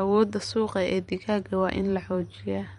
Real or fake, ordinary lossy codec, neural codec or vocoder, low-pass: fake; MP3, 48 kbps; vocoder, 44.1 kHz, 128 mel bands every 512 samples, BigVGAN v2; 19.8 kHz